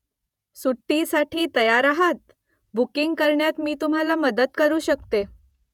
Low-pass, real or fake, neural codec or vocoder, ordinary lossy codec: 19.8 kHz; fake; vocoder, 48 kHz, 128 mel bands, Vocos; none